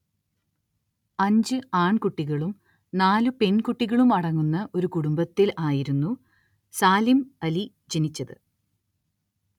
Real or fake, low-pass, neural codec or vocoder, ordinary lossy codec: real; 19.8 kHz; none; none